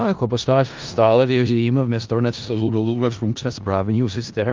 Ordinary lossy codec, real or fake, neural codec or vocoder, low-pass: Opus, 24 kbps; fake; codec, 16 kHz in and 24 kHz out, 0.4 kbps, LongCat-Audio-Codec, four codebook decoder; 7.2 kHz